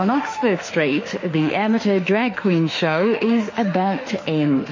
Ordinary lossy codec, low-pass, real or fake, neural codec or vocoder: MP3, 32 kbps; 7.2 kHz; fake; autoencoder, 48 kHz, 32 numbers a frame, DAC-VAE, trained on Japanese speech